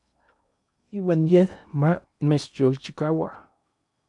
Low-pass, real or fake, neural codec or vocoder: 10.8 kHz; fake; codec, 16 kHz in and 24 kHz out, 0.8 kbps, FocalCodec, streaming, 65536 codes